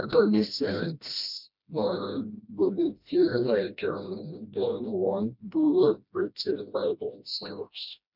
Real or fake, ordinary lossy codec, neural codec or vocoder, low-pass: fake; none; codec, 16 kHz, 1 kbps, FreqCodec, smaller model; 5.4 kHz